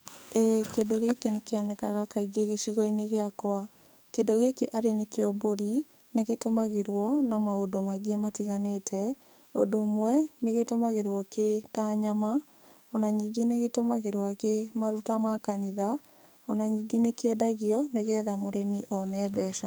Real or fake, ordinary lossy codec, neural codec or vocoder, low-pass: fake; none; codec, 44.1 kHz, 2.6 kbps, SNAC; none